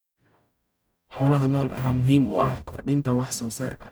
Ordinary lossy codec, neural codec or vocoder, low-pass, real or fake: none; codec, 44.1 kHz, 0.9 kbps, DAC; none; fake